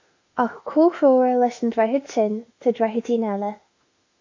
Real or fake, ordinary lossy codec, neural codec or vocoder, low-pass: fake; AAC, 48 kbps; autoencoder, 48 kHz, 32 numbers a frame, DAC-VAE, trained on Japanese speech; 7.2 kHz